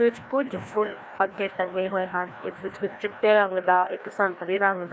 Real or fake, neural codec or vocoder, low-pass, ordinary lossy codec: fake; codec, 16 kHz, 1 kbps, FreqCodec, larger model; none; none